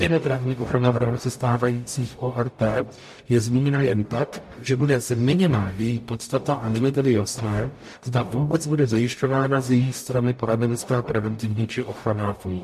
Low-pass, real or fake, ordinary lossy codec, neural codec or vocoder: 14.4 kHz; fake; MP3, 64 kbps; codec, 44.1 kHz, 0.9 kbps, DAC